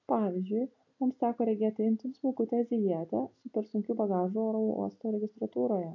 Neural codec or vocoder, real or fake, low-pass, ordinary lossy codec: none; real; 7.2 kHz; AAC, 48 kbps